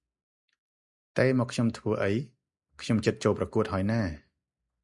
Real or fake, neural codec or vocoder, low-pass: real; none; 10.8 kHz